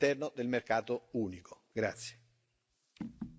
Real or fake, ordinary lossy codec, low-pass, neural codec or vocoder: real; none; none; none